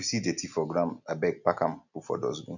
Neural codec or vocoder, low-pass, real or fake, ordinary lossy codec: none; 7.2 kHz; real; none